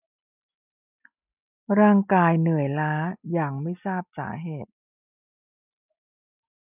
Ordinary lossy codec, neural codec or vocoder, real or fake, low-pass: none; none; real; 3.6 kHz